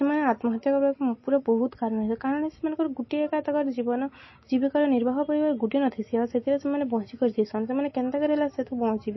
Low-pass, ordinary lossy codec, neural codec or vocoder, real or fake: 7.2 kHz; MP3, 24 kbps; none; real